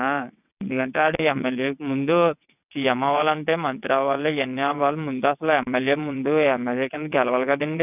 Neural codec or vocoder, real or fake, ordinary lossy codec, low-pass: vocoder, 22.05 kHz, 80 mel bands, WaveNeXt; fake; none; 3.6 kHz